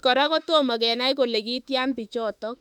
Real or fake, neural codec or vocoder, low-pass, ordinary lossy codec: fake; autoencoder, 48 kHz, 32 numbers a frame, DAC-VAE, trained on Japanese speech; 19.8 kHz; none